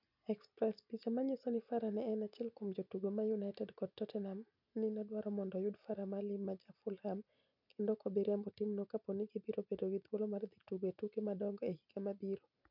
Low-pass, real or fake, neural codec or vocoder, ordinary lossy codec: 5.4 kHz; real; none; none